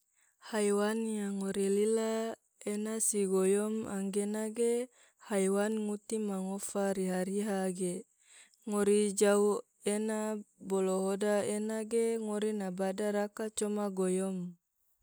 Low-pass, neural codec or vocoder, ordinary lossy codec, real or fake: none; none; none; real